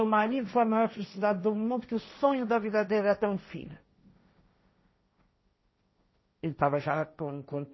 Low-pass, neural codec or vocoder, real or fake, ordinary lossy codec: 7.2 kHz; codec, 16 kHz, 1.1 kbps, Voila-Tokenizer; fake; MP3, 24 kbps